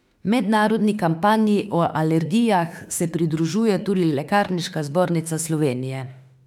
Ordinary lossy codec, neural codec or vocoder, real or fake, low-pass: none; autoencoder, 48 kHz, 32 numbers a frame, DAC-VAE, trained on Japanese speech; fake; 19.8 kHz